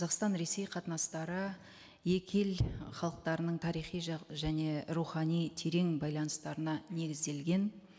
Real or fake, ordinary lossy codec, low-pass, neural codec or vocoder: real; none; none; none